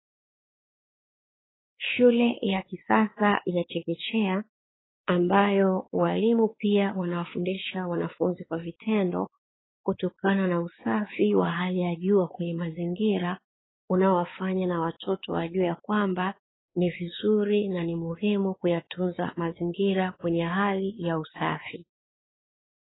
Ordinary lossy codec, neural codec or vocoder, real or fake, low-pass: AAC, 16 kbps; codec, 16 kHz, 4 kbps, X-Codec, WavLM features, trained on Multilingual LibriSpeech; fake; 7.2 kHz